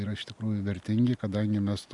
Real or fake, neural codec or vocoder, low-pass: real; none; 10.8 kHz